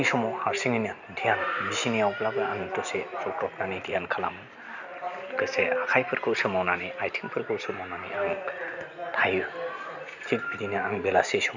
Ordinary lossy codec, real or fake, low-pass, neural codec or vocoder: none; real; 7.2 kHz; none